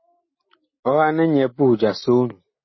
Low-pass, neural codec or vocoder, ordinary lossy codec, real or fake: 7.2 kHz; none; MP3, 24 kbps; real